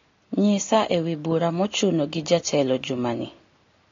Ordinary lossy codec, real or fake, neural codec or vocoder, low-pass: AAC, 32 kbps; real; none; 7.2 kHz